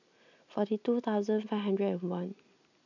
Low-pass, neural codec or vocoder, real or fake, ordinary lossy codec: 7.2 kHz; none; real; none